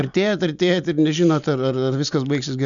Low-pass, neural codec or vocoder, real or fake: 7.2 kHz; none; real